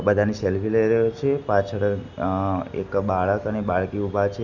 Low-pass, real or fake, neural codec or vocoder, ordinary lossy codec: 7.2 kHz; real; none; none